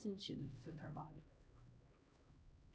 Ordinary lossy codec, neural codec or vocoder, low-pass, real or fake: none; codec, 16 kHz, 1 kbps, X-Codec, HuBERT features, trained on LibriSpeech; none; fake